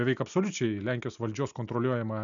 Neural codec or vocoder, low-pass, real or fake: none; 7.2 kHz; real